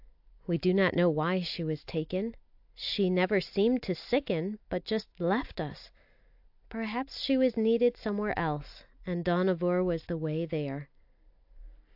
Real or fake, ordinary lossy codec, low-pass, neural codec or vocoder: real; AAC, 48 kbps; 5.4 kHz; none